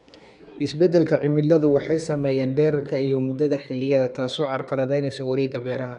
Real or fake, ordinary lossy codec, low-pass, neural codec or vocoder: fake; none; 10.8 kHz; codec, 24 kHz, 1 kbps, SNAC